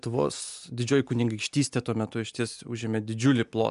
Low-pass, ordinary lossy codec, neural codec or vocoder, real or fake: 10.8 kHz; MP3, 96 kbps; none; real